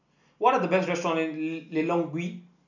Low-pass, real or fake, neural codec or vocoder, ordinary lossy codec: 7.2 kHz; real; none; none